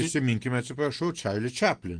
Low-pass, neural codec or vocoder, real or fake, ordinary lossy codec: 9.9 kHz; none; real; Opus, 24 kbps